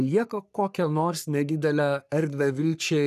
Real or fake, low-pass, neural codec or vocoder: fake; 14.4 kHz; codec, 44.1 kHz, 3.4 kbps, Pupu-Codec